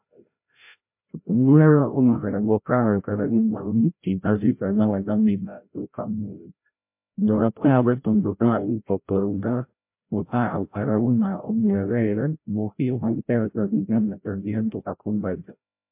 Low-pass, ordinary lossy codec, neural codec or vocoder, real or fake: 3.6 kHz; MP3, 32 kbps; codec, 16 kHz, 0.5 kbps, FreqCodec, larger model; fake